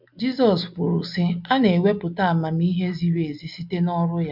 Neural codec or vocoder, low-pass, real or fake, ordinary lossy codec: none; 5.4 kHz; real; MP3, 48 kbps